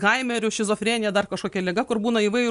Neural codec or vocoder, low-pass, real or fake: none; 10.8 kHz; real